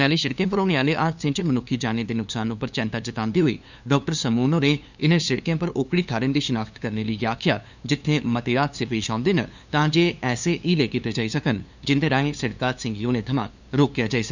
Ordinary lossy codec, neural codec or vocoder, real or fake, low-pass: none; codec, 16 kHz, 2 kbps, FunCodec, trained on LibriTTS, 25 frames a second; fake; 7.2 kHz